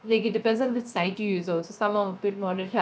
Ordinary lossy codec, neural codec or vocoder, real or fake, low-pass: none; codec, 16 kHz, 0.7 kbps, FocalCodec; fake; none